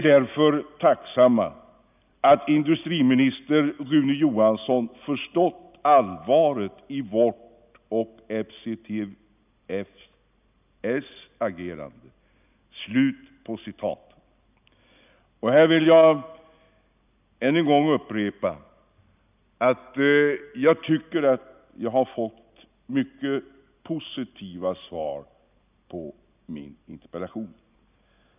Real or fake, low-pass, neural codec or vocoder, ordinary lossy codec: real; 3.6 kHz; none; none